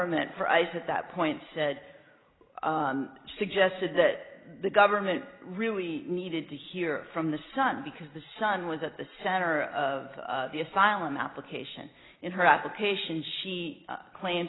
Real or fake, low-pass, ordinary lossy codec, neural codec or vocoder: real; 7.2 kHz; AAC, 16 kbps; none